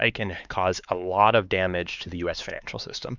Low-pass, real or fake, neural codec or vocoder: 7.2 kHz; fake; codec, 16 kHz, 4 kbps, X-Codec, HuBERT features, trained on LibriSpeech